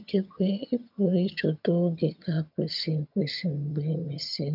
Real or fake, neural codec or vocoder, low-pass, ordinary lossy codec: fake; vocoder, 22.05 kHz, 80 mel bands, HiFi-GAN; 5.4 kHz; none